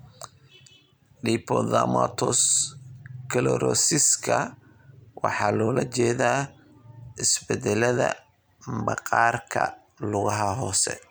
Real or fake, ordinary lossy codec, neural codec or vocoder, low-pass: real; none; none; none